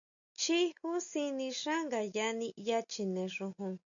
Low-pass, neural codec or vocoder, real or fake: 7.2 kHz; none; real